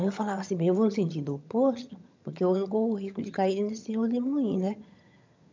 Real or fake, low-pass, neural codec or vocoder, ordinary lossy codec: fake; 7.2 kHz; vocoder, 22.05 kHz, 80 mel bands, HiFi-GAN; MP3, 64 kbps